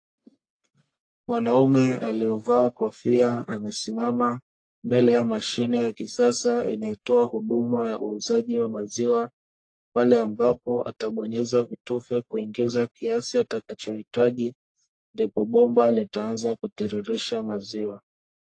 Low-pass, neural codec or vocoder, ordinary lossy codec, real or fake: 9.9 kHz; codec, 44.1 kHz, 1.7 kbps, Pupu-Codec; AAC, 48 kbps; fake